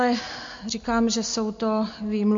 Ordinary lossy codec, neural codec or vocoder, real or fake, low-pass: MP3, 48 kbps; none; real; 7.2 kHz